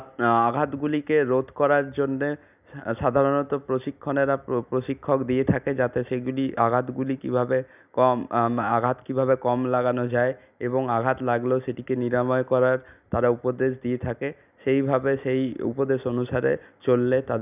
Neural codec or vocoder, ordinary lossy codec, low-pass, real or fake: none; none; 3.6 kHz; real